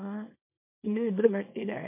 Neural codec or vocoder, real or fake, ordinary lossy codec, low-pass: codec, 24 kHz, 0.9 kbps, WavTokenizer, small release; fake; MP3, 32 kbps; 3.6 kHz